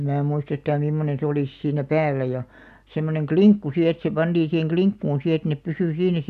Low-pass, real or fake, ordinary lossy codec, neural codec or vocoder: 14.4 kHz; real; none; none